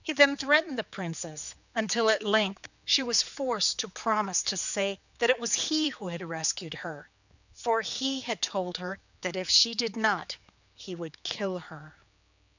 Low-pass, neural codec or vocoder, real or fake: 7.2 kHz; codec, 16 kHz, 4 kbps, X-Codec, HuBERT features, trained on general audio; fake